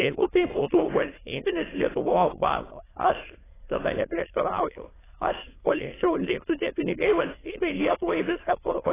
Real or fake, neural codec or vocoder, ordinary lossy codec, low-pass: fake; autoencoder, 22.05 kHz, a latent of 192 numbers a frame, VITS, trained on many speakers; AAC, 16 kbps; 3.6 kHz